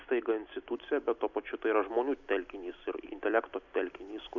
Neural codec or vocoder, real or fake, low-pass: none; real; 7.2 kHz